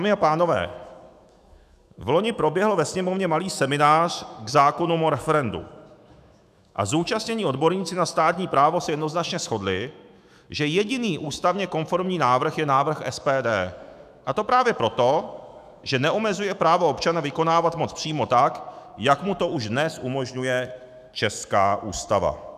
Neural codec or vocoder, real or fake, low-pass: autoencoder, 48 kHz, 128 numbers a frame, DAC-VAE, trained on Japanese speech; fake; 14.4 kHz